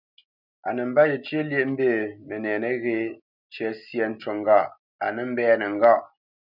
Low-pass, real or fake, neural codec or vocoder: 5.4 kHz; real; none